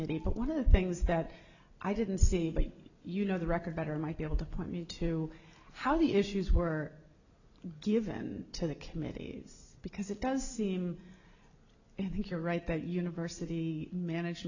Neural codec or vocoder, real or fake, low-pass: none; real; 7.2 kHz